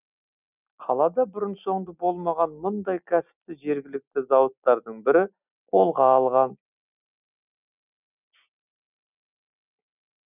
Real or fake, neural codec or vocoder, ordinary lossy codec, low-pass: real; none; none; 3.6 kHz